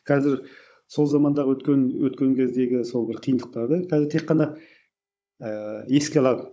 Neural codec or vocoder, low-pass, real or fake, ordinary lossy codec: codec, 16 kHz, 16 kbps, FunCodec, trained on Chinese and English, 50 frames a second; none; fake; none